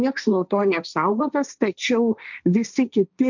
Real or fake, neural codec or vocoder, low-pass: fake; codec, 16 kHz, 1.1 kbps, Voila-Tokenizer; 7.2 kHz